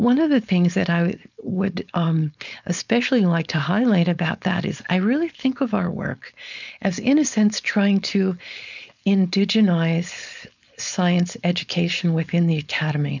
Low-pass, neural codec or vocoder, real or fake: 7.2 kHz; codec, 16 kHz, 4.8 kbps, FACodec; fake